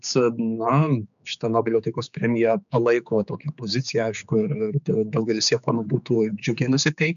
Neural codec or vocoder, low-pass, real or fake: codec, 16 kHz, 4 kbps, X-Codec, HuBERT features, trained on general audio; 7.2 kHz; fake